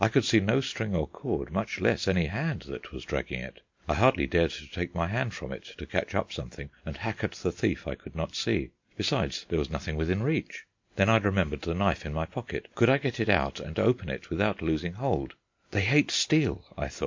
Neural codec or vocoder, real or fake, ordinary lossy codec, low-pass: none; real; MP3, 48 kbps; 7.2 kHz